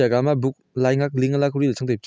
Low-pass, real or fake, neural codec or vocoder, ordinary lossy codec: none; real; none; none